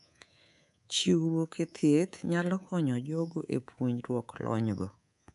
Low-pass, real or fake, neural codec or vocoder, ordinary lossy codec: 10.8 kHz; fake; codec, 24 kHz, 3.1 kbps, DualCodec; none